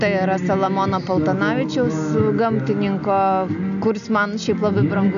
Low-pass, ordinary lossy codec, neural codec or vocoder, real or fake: 7.2 kHz; AAC, 96 kbps; none; real